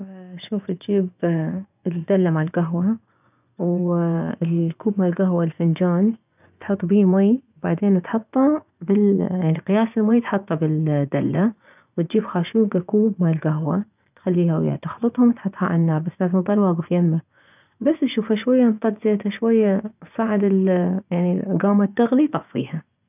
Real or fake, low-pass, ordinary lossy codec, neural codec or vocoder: fake; 3.6 kHz; none; vocoder, 24 kHz, 100 mel bands, Vocos